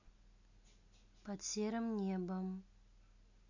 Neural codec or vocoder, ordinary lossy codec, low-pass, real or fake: none; none; 7.2 kHz; real